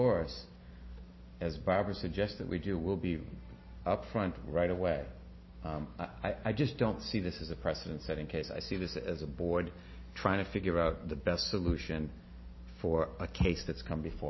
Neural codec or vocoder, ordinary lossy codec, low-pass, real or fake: none; MP3, 24 kbps; 7.2 kHz; real